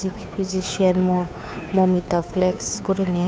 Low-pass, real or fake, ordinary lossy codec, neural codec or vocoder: none; fake; none; codec, 16 kHz, 2 kbps, FunCodec, trained on Chinese and English, 25 frames a second